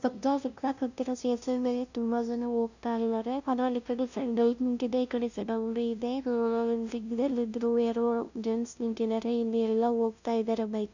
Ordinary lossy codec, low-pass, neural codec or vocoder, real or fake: none; 7.2 kHz; codec, 16 kHz, 0.5 kbps, FunCodec, trained on LibriTTS, 25 frames a second; fake